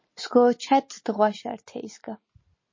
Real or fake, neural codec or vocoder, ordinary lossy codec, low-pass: real; none; MP3, 32 kbps; 7.2 kHz